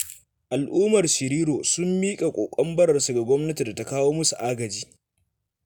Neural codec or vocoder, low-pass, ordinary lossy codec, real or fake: none; none; none; real